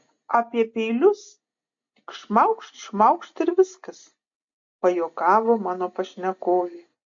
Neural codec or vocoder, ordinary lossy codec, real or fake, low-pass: none; AAC, 48 kbps; real; 7.2 kHz